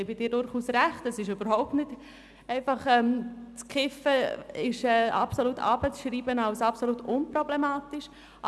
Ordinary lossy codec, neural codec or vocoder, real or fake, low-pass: none; none; real; none